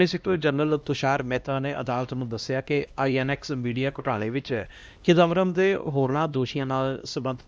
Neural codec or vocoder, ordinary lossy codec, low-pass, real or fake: codec, 16 kHz, 1 kbps, X-Codec, HuBERT features, trained on LibriSpeech; none; none; fake